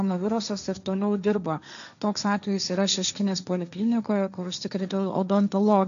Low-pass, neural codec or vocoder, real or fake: 7.2 kHz; codec, 16 kHz, 1.1 kbps, Voila-Tokenizer; fake